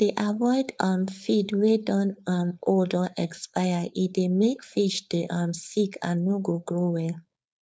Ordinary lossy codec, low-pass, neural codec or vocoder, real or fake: none; none; codec, 16 kHz, 4.8 kbps, FACodec; fake